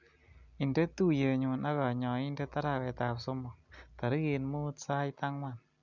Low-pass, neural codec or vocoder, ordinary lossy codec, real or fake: 7.2 kHz; none; none; real